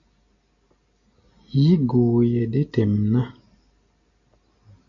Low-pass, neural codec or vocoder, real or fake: 7.2 kHz; none; real